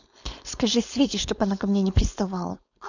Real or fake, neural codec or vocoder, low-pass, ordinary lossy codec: fake; codec, 16 kHz, 4.8 kbps, FACodec; 7.2 kHz; none